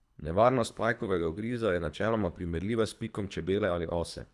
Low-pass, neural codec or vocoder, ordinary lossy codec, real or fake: none; codec, 24 kHz, 3 kbps, HILCodec; none; fake